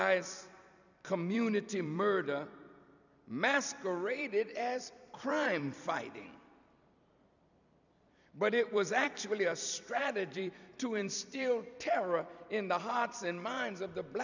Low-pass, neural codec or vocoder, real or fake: 7.2 kHz; vocoder, 44.1 kHz, 128 mel bands every 256 samples, BigVGAN v2; fake